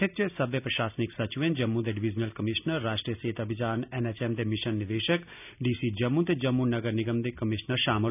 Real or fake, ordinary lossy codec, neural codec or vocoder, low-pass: real; none; none; 3.6 kHz